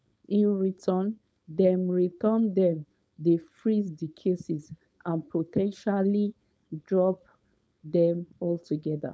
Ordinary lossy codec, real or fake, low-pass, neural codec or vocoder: none; fake; none; codec, 16 kHz, 4.8 kbps, FACodec